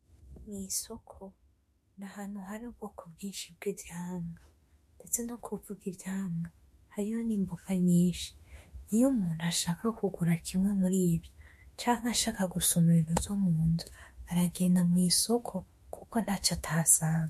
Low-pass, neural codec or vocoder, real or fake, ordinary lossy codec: 14.4 kHz; autoencoder, 48 kHz, 32 numbers a frame, DAC-VAE, trained on Japanese speech; fake; MP3, 64 kbps